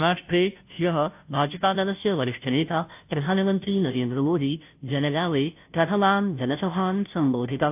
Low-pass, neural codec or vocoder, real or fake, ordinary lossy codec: 3.6 kHz; codec, 16 kHz, 0.5 kbps, FunCodec, trained on Chinese and English, 25 frames a second; fake; AAC, 32 kbps